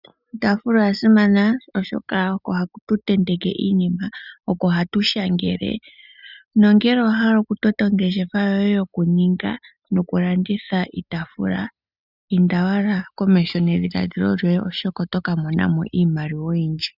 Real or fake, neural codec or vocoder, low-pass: real; none; 5.4 kHz